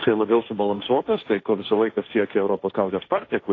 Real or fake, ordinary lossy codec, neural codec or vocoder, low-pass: fake; AAC, 32 kbps; codec, 16 kHz, 1.1 kbps, Voila-Tokenizer; 7.2 kHz